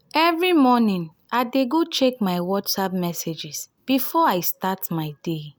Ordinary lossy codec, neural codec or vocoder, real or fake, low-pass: none; none; real; none